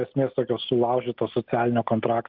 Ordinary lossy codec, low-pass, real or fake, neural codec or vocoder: Opus, 32 kbps; 5.4 kHz; real; none